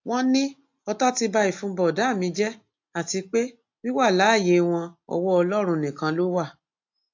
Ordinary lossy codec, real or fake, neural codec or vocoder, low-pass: AAC, 48 kbps; real; none; 7.2 kHz